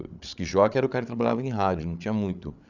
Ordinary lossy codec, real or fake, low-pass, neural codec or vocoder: none; fake; 7.2 kHz; codec, 16 kHz, 8 kbps, FreqCodec, larger model